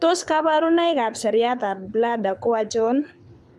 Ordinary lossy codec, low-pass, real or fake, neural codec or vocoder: none; none; fake; codec, 24 kHz, 6 kbps, HILCodec